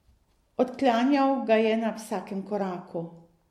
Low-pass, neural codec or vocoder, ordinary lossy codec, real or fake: 19.8 kHz; none; MP3, 64 kbps; real